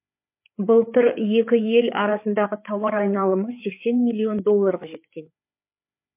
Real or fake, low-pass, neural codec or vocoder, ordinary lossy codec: fake; 3.6 kHz; codec, 16 kHz, 4 kbps, FreqCodec, larger model; MP3, 32 kbps